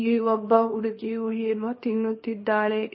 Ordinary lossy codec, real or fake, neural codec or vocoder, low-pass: MP3, 24 kbps; fake; codec, 24 kHz, 0.9 kbps, WavTokenizer, small release; 7.2 kHz